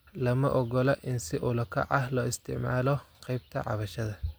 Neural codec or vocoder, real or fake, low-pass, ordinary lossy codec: none; real; none; none